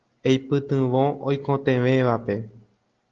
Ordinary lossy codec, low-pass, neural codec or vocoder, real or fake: Opus, 16 kbps; 7.2 kHz; none; real